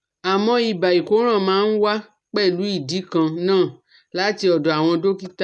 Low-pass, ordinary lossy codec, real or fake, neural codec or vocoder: 10.8 kHz; none; real; none